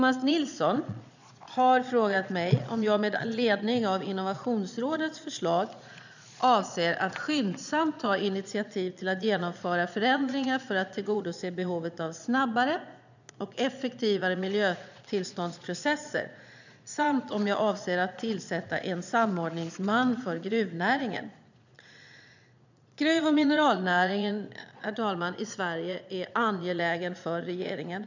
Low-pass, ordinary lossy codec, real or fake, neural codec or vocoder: 7.2 kHz; none; fake; vocoder, 22.05 kHz, 80 mel bands, Vocos